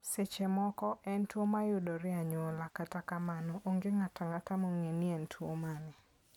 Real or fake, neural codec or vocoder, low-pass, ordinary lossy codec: real; none; 19.8 kHz; none